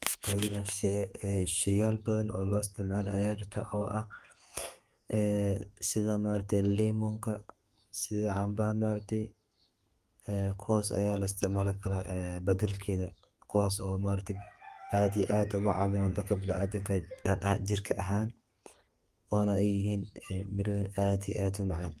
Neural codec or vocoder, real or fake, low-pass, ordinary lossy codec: codec, 32 kHz, 1.9 kbps, SNAC; fake; 14.4 kHz; Opus, 32 kbps